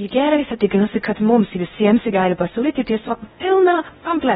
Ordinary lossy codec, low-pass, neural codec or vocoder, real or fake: AAC, 16 kbps; 10.8 kHz; codec, 16 kHz in and 24 kHz out, 0.6 kbps, FocalCodec, streaming, 2048 codes; fake